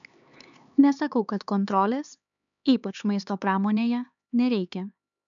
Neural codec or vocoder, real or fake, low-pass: codec, 16 kHz, 4 kbps, X-Codec, HuBERT features, trained on LibriSpeech; fake; 7.2 kHz